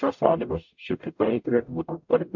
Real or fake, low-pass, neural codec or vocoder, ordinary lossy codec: fake; 7.2 kHz; codec, 44.1 kHz, 0.9 kbps, DAC; MP3, 48 kbps